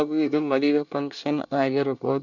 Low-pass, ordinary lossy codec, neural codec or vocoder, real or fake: 7.2 kHz; none; codec, 24 kHz, 1 kbps, SNAC; fake